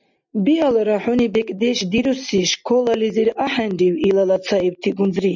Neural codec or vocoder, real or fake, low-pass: none; real; 7.2 kHz